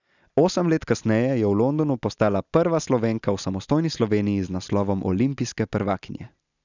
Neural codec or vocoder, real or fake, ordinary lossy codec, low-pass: none; real; none; 7.2 kHz